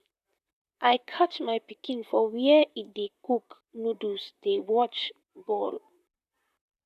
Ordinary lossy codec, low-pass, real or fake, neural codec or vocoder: none; 14.4 kHz; fake; vocoder, 44.1 kHz, 128 mel bands, Pupu-Vocoder